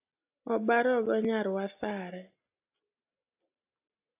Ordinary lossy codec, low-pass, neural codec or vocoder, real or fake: AAC, 32 kbps; 3.6 kHz; none; real